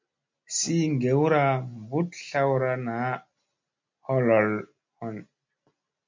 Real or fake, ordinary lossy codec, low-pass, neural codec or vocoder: real; MP3, 64 kbps; 7.2 kHz; none